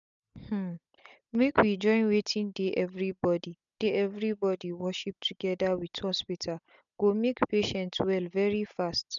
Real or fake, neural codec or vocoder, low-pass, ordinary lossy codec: real; none; 7.2 kHz; none